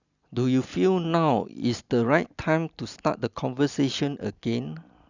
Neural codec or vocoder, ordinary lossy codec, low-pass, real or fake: none; none; 7.2 kHz; real